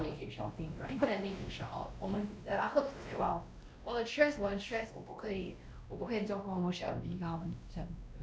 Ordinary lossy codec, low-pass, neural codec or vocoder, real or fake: none; none; codec, 16 kHz, 1 kbps, X-Codec, WavLM features, trained on Multilingual LibriSpeech; fake